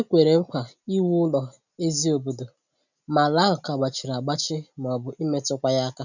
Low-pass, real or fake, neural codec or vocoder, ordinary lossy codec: 7.2 kHz; real; none; none